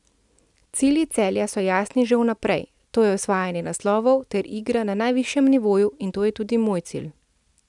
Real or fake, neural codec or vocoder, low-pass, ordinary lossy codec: real; none; 10.8 kHz; none